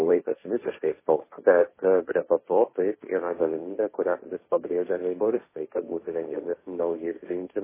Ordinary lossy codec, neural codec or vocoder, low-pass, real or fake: MP3, 16 kbps; codec, 16 kHz, 1.1 kbps, Voila-Tokenizer; 3.6 kHz; fake